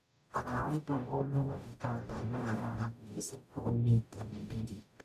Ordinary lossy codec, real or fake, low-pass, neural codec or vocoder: none; fake; 14.4 kHz; codec, 44.1 kHz, 0.9 kbps, DAC